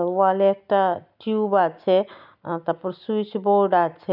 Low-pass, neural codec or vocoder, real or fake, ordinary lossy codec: 5.4 kHz; none; real; none